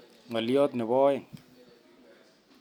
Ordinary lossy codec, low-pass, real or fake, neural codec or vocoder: none; 19.8 kHz; real; none